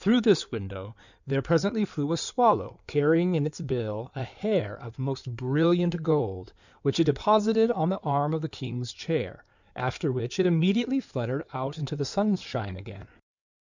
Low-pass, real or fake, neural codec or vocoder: 7.2 kHz; fake; codec, 16 kHz in and 24 kHz out, 2.2 kbps, FireRedTTS-2 codec